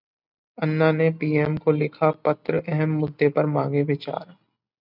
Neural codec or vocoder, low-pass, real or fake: none; 5.4 kHz; real